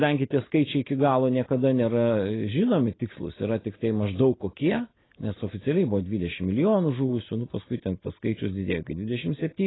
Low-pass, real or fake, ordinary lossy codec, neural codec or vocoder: 7.2 kHz; real; AAC, 16 kbps; none